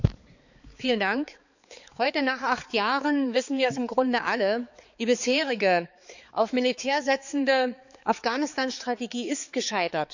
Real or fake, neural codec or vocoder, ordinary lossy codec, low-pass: fake; codec, 16 kHz, 4 kbps, X-Codec, HuBERT features, trained on balanced general audio; none; 7.2 kHz